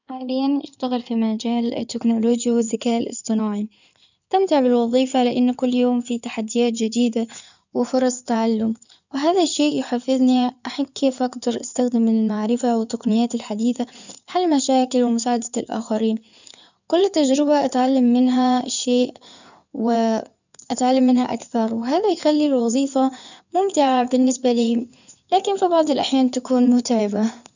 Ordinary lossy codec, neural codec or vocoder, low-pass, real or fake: none; codec, 16 kHz in and 24 kHz out, 2.2 kbps, FireRedTTS-2 codec; 7.2 kHz; fake